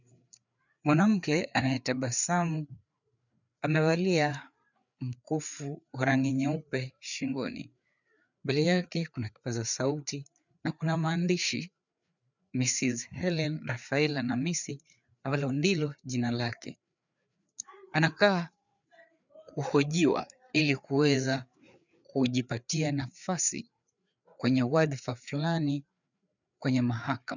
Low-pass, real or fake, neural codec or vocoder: 7.2 kHz; fake; codec, 16 kHz, 4 kbps, FreqCodec, larger model